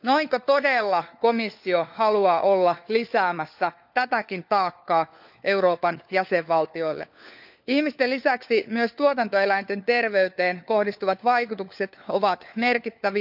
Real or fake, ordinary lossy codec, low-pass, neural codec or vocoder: fake; none; 5.4 kHz; codec, 16 kHz, 4 kbps, FunCodec, trained on LibriTTS, 50 frames a second